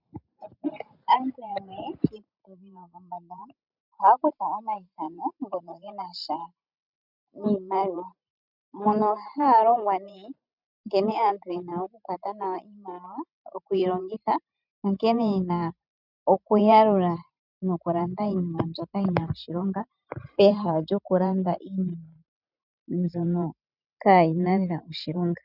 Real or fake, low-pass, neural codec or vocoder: fake; 5.4 kHz; vocoder, 44.1 kHz, 128 mel bands every 512 samples, BigVGAN v2